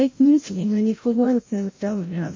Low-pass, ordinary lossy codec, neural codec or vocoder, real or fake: 7.2 kHz; MP3, 32 kbps; codec, 16 kHz, 0.5 kbps, FreqCodec, larger model; fake